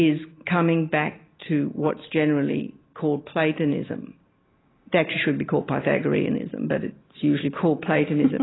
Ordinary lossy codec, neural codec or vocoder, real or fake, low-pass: AAC, 16 kbps; none; real; 7.2 kHz